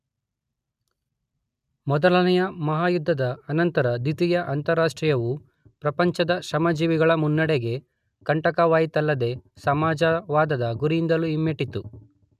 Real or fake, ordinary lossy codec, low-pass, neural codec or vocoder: real; none; 14.4 kHz; none